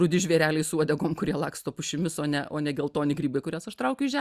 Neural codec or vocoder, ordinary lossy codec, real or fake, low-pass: none; Opus, 64 kbps; real; 14.4 kHz